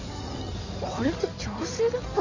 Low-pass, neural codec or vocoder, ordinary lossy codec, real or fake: 7.2 kHz; codec, 16 kHz in and 24 kHz out, 2.2 kbps, FireRedTTS-2 codec; none; fake